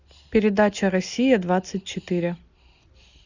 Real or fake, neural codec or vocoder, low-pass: real; none; 7.2 kHz